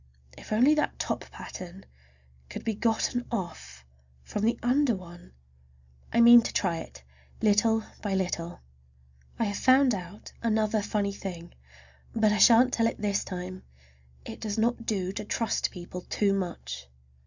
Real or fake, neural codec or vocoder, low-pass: real; none; 7.2 kHz